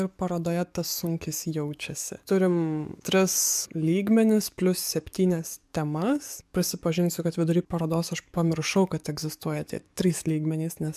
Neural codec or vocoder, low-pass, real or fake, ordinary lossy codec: codec, 44.1 kHz, 7.8 kbps, DAC; 14.4 kHz; fake; MP3, 96 kbps